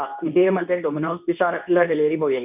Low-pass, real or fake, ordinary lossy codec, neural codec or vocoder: 3.6 kHz; fake; none; codec, 24 kHz, 0.9 kbps, WavTokenizer, medium speech release version 2